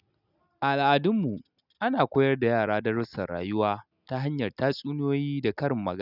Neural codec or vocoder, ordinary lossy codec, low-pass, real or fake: none; none; 5.4 kHz; real